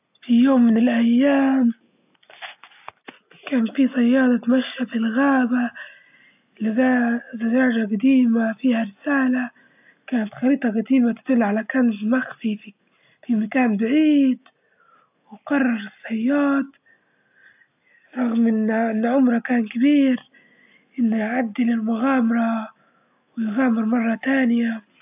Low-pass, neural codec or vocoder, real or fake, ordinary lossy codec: 3.6 kHz; none; real; AAC, 32 kbps